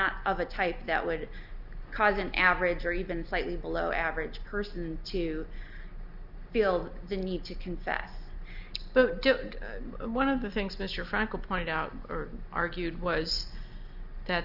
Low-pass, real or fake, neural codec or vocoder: 5.4 kHz; real; none